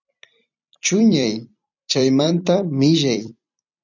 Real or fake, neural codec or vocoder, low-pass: fake; vocoder, 44.1 kHz, 128 mel bands every 256 samples, BigVGAN v2; 7.2 kHz